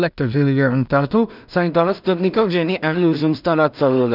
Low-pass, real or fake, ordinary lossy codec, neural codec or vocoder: 5.4 kHz; fake; none; codec, 16 kHz in and 24 kHz out, 0.4 kbps, LongCat-Audio-Codec, two codebook decoder